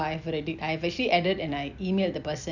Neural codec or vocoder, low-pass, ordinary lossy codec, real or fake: none; 7.2 kHz; none; real